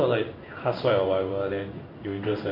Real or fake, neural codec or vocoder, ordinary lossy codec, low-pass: fake; codec, 16 kHz in and 24 kHz out, 1 kbps, XY-Tokenizer; MP3, 32 kbps; 5.4 kHz